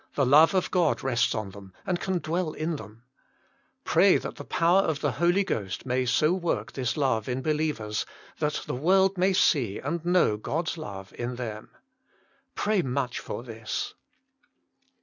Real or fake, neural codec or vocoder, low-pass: real; none; 7.2 kHz